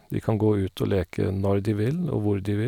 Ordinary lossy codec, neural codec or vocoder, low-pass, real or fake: none; none; 19.8 kHz; real